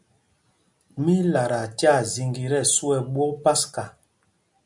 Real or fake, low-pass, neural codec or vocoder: real; 10.8 kHz; none